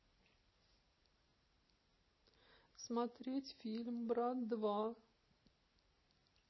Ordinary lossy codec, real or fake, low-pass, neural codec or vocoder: MP3, 24 kbps; real; 7.2 kHz; none